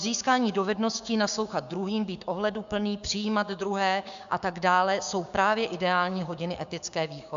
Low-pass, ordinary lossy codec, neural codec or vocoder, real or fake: 7.2 kHz; AAC, 96 kbps; codec, 16 kHz, 6 kbps, DAC; fake